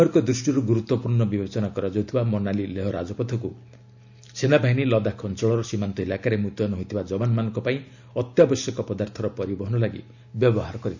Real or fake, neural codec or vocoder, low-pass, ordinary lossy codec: real; none; 7.2 kHz; none